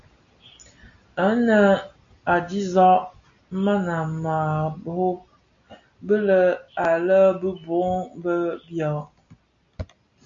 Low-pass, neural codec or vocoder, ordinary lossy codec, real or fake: 7.2 kHz; none; MP3, 48 kbps; real